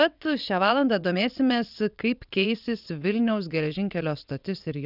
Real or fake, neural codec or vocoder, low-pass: fake; vocoder, 22.05 kHz, 80 mel bands, WaveNeXt; 5.4 kHz